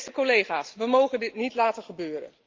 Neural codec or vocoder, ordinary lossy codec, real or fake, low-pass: none; Opus, 32 kbps; real; 7.2 kHz